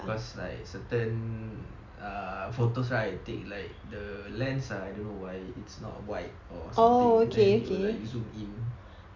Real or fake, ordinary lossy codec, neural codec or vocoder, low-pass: real; MP3, 64 kbps; none; 7.2 kHz